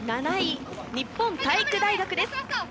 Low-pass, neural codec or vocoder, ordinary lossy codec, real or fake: none; none; none; real